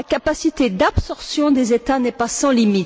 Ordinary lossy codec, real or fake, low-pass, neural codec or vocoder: none; real; none; none